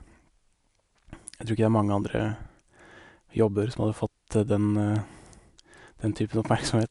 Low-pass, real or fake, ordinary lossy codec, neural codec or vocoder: 10.8 kHz; real; none; none